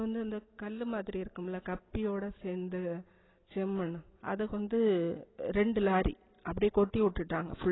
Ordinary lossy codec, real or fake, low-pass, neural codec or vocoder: AAC, 16 kbps; real; 7.2 kHz; none